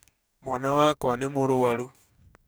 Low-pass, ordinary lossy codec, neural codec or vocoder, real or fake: none; none; codec, 44.1 kHz, 2.6 kbps, DAC; fake